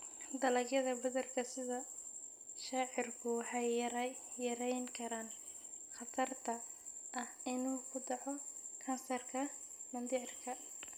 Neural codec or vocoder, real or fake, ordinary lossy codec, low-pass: none; real; none; none